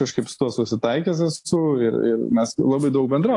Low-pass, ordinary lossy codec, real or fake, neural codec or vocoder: 10.8 kHz; AAC, 48 kbps; real; none